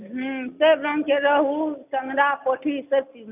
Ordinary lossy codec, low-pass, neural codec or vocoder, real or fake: none; 3.6 kHz; none; real